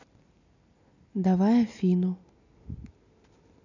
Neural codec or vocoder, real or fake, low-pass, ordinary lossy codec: none; real; 7.2 kHz; none